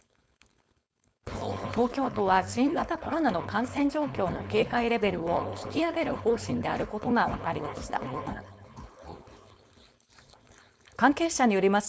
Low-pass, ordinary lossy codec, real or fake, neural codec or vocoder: none; none; fake; codec, 16 kHz, 4.8 kbps, FACodec